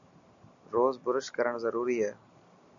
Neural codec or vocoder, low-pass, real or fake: none; 7.2 kHz; real